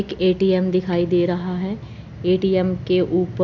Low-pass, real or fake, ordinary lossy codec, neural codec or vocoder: 7.2 kHz; real; none; none